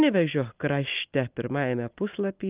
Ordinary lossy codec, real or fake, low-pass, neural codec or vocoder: Opus, 32 kbps; real; 3.6 kHz; none